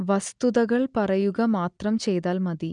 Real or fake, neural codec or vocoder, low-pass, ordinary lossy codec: real; none; 9.9 kHz; none